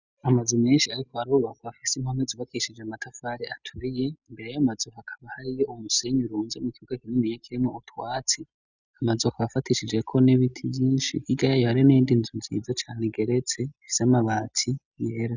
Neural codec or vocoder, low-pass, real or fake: none; 7.2 kHz; real